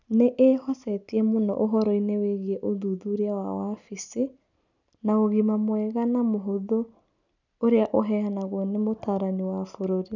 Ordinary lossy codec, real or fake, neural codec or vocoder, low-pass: none; real; none; 7.2 kHz